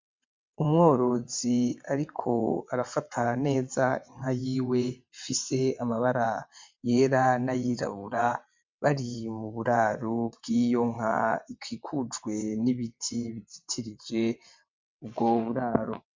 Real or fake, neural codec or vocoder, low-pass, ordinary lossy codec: fake; vocoder, 22.05 kHz, 80 mel bands, WaveNeXt; 7.2 kHz; MP3, 64 kbps